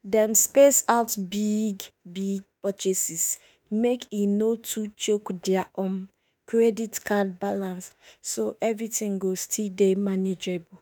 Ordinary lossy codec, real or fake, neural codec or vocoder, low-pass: none; fake; autoencoder, 48 kHz, 32 numbers a frame, DAC-VAE, trained on Japanese speech; none